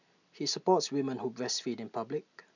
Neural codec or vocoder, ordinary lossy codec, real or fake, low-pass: none; none; real; 7.2 kHz